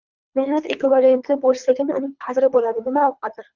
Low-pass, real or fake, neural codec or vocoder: 7.2 kHz; fake; codec, 24 kHz, 3 kbps, HILCodec